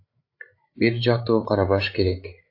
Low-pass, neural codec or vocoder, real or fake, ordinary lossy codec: 5.4 kHz; codec, 16 kHz, 8 kbps, FreqCodec, larger model; fake; AAC, 32 kbps